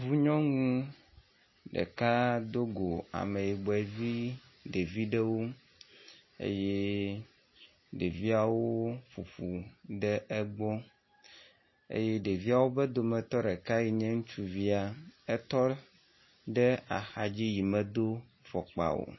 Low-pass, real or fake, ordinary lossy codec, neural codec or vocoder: 7.2 kHz; real; MP3, 24 kbps; none